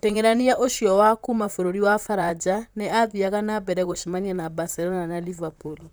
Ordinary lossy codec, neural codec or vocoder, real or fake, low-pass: none; vocoder, 44.1 kHz, 128 mel bands, Pupu-Vocoder; fake; none